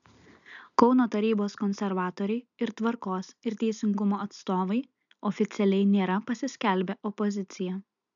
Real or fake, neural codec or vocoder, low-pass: real; none; 7.2 kHz